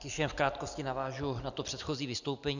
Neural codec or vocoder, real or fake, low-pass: none; real; 7.2 kHz